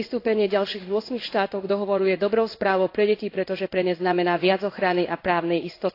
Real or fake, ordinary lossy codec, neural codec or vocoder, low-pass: fake; AAC, 32 kbps; codec, 16 kHz in and 24 kHz out, 1 kbps, XY-Tokenizer; 5.4 kHz